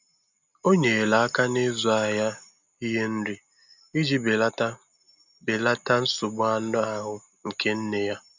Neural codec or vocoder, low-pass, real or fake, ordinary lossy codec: none; 7.2 kHz; real; none